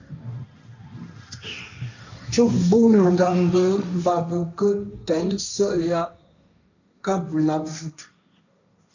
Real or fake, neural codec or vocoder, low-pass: fake; codec, 16 kHz, 1.1 kbps, Voila-Tokenizer; 7.2 kHz